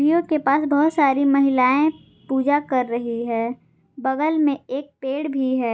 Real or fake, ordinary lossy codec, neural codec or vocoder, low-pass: real; none; none; none